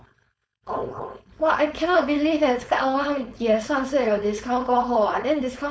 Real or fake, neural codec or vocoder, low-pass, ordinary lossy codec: fake; codec, 16 kHz, 4.8 kbps, FACodec; none; none